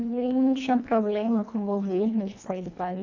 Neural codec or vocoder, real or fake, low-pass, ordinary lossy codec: codec, 24 kHz, 1.5 kbps, HILCodec; fake; 7.2 kHz; none